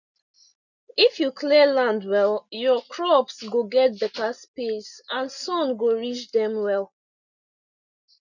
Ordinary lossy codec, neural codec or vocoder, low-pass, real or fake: none; none; 7.2 kHz; real